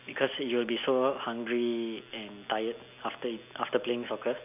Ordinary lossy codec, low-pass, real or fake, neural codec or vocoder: none; 3.6 kHz; real; none